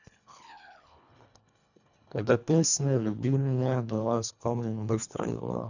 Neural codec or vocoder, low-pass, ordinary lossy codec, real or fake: codec, 24 kHz, 1.5 kbps, HILCodec; 7.2 kHz; none; fake